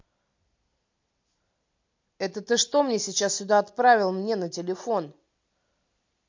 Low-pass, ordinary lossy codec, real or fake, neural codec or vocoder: 7.2 kHz; MP3, 48 kbps; real; none